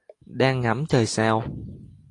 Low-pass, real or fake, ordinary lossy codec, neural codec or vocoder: 10.8 kHz; real; AAC, 48 kbps; none